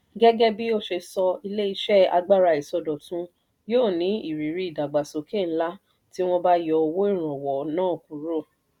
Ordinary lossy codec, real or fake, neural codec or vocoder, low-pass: none; real; none; 19.8 kHz